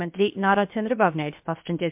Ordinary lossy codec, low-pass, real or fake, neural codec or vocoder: MP3, 32 kbps; 3.6 kHz; fake; codec, 16 kHz, 0.7 kbps, FocalCodec